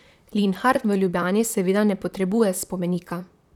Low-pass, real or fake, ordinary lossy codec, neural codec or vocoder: 19.8 kHz; fake; none; vocoder, 44.1 kHz, 128 mel bands, Pupu-Vocoder